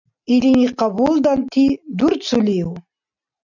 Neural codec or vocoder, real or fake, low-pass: none; real; 7.2 kHz